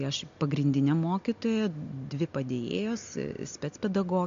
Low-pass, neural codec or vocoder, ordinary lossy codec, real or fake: 7.2 kHz; none; MP3, 48 kbps; real